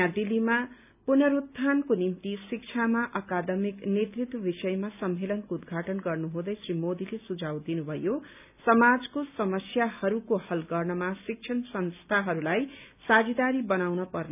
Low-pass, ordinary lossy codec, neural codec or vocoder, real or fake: 3.6 kHz; none; none; real